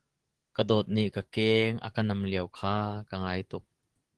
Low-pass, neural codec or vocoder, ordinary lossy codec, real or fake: 10.8 kHz; codec, 44.1 kHz, 7.8 kbps, DAC; Opus, 16 kbps; fake